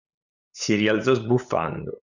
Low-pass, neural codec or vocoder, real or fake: 7.2 kHz; codec, 16 kHz, 8 kbps, FunCodec, trained on LibriTTS, 25 frames a second; fake